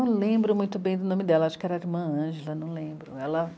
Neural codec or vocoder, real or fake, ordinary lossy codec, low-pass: none; real; none; none